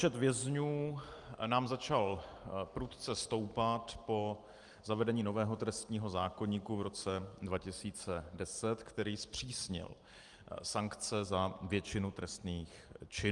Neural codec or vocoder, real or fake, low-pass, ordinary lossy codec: none; real; 10.8 kHz; Opus, 32 kbps